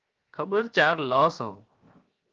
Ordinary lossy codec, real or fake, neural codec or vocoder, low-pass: Opus, 24 kbps; fake; codec, 16 kHz, 0.7 kbps, FocalCodec; 7.2 kHz